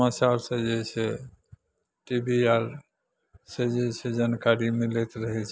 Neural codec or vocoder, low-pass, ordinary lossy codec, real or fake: none; none; none; real